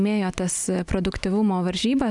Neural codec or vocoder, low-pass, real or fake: none; 10.8 kHz; real